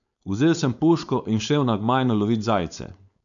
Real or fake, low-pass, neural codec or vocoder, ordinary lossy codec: fake; 7.2 kHz; codec, 16 kHz, 4.8 kbps, FACodec; none